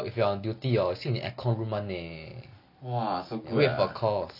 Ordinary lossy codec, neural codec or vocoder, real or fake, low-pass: none; none; real; 5.4 kHz